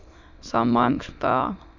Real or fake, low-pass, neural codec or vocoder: fake; 7.2 kHz; autoencoder, 22.05 kHz, a latent of 192 numbers a frame, VITS, trained on many speakers